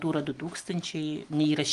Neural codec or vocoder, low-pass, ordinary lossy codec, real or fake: none; 10.8 kHz; Opus, 24 kbps; real